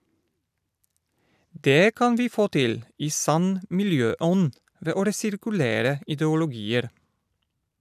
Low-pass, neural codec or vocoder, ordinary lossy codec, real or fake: 14.4 kHz; none; none; real